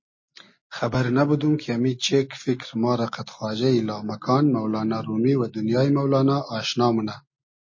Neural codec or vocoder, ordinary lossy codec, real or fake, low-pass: none; MP3, 32 kbps; real; 7.2 kHz